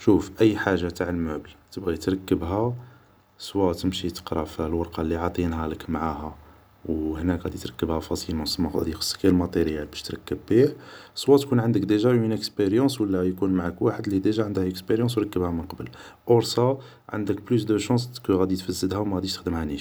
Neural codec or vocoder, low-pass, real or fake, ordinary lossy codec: none; none; real; none